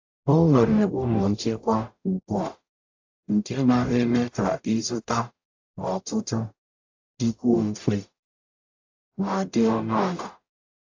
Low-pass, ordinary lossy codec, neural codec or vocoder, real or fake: 7.2 kHz; AAC, 48 kbps; codec, 44.1 kHz, 0.9 kbps, DAC; fake